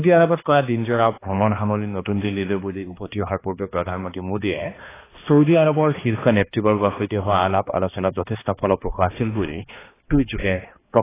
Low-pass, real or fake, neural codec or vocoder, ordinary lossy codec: 3.6 kHz; fake; codec, 16 kHz, 2 kbps, X-Codec, HuBERT features, trained on balanced general audio; AAC, 16 kbps